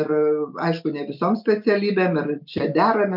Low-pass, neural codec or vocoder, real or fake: 5.4 kHz; none; real